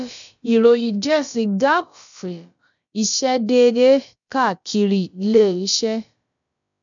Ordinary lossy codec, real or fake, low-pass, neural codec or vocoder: none; fake; 7.2 kHz; codec, 16 kHz, about 1 kbps, DyCAST, with the encoder's durations